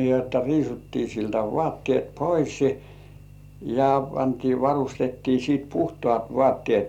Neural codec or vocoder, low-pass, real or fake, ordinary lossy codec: none; 19.8 kHz; real; none